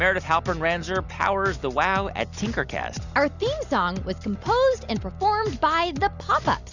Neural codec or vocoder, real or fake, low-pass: none; real; 7.2 kHz